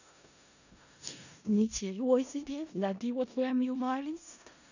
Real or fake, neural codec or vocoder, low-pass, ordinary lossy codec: fake; codec, 16 kHz in and 24 kHz out, 0.4 kbps, LongCat-Audio-Codec, four codebook decoder; 7.2 kHz; none